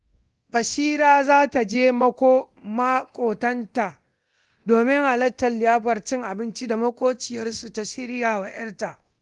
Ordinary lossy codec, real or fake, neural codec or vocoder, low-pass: Opus, 24 kbps; fake; codec, 24 kHz, 0.9 kbps, DualCodec; 10.8 kHz